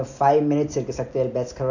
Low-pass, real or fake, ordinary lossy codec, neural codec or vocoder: 7.2 kHz; real; none; none